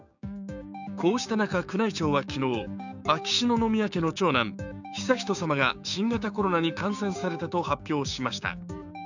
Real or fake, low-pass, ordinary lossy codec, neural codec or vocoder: fake; 7.2 kHz; none; codec, 16 kHz, 6 kbps, DAC